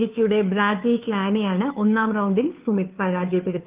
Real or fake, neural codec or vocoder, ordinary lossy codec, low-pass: fake; codec, 16 kHz, 2 kbps, FunCodec, trained on Chinese and English, 25 frames a second; Opus, 32 kbps; 3.6 kHz